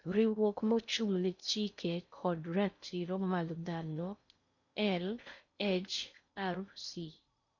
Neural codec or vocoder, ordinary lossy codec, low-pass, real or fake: codec, 16 kHz in and 24 kHz out, 0.6 kbps, FocalCodec, streaming, 2048 codes; none; 7.2 kHz; fake